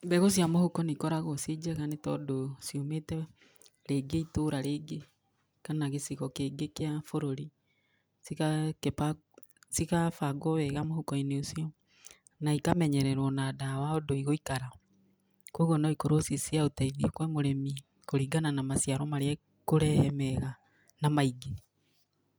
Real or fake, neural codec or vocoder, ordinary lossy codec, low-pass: real; none; none; none